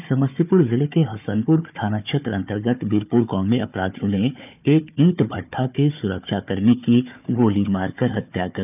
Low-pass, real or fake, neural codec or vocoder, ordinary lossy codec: 3.6 kHz; fake; codec, 16 kHz, 4 kbps, FunCodec, trained on Chinese and English, 50 frames a second; none